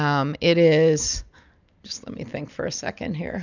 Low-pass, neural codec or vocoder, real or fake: 7.2 kHz; none; real